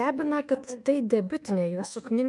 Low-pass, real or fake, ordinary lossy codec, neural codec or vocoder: 10.8 kHz; fake; MP3, 96 kbps; codec, 24 kHz, 1.2 kbps, DualCodec